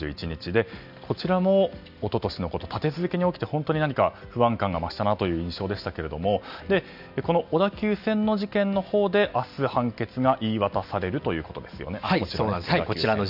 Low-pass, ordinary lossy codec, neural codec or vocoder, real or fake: 5.4 kHz; none; none; real